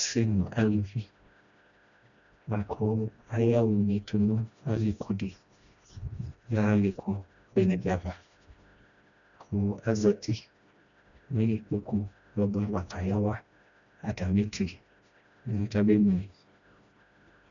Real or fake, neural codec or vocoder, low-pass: fake; codec, 16 kHz, 1 kbps, FreqCodec, smaller model; 7.2 kHz